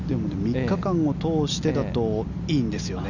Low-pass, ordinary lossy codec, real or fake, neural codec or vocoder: 7.2 kHz; none; real; none